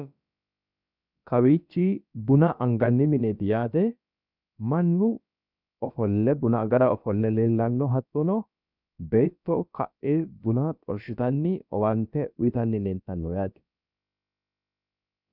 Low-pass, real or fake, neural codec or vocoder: 5.4 kHz; fake; codec, 16 kHz, about 1 kbps, DyCAST, with the encoder's durations